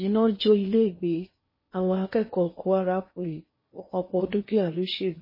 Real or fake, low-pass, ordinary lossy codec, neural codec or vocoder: fake; 5.4 kHz; MP3, 24 kbps; codec, 16 kHz in and 24 kHz out, 0.8 kbps, FocalCodec, streaming, 65536 codes